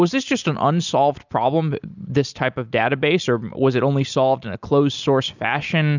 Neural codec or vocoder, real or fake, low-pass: none; real; 7.2 kHz